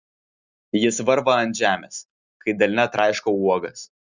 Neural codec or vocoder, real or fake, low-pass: none; real; 7.2 kHz